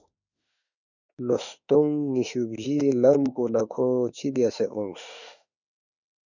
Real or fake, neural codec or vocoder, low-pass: fake; autoencoder, 48 kHz, 32 numbers a frame, DAC-VAE, trained on Japanese speech; 7.2 kHz